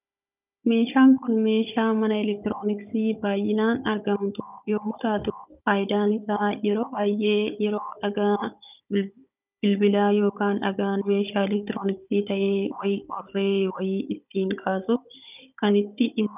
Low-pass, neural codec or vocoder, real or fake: 3.6 kHz; codec, 16 kHz, 16 kbps, FunCodec, trained on Chinese and English, 50 frames a second; fake